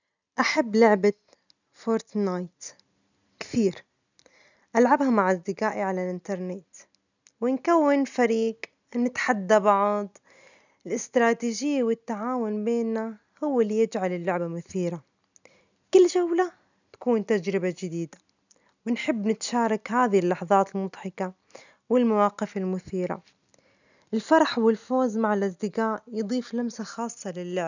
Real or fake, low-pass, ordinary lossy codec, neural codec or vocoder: real; 7.2 kHz; none; none